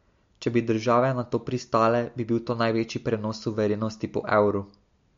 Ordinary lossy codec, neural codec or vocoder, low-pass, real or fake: MP3, 48 kbps; none; 7.2 kHz; real